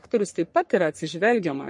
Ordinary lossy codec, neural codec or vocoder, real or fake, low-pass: MP3, 48 kbps; codec, 44.1 kHz, 3.4 kbps, Pupu-Codec; fake; 10.8 kHz